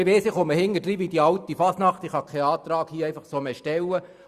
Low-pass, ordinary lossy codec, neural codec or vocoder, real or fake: 14.4 kHz; Opus, 64 kbps; vocoder, 44.1 kHz, 128 mel bands every 256 samples, BigVGAN v2; fake